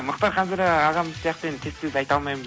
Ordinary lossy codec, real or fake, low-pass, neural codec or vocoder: none; real; none; none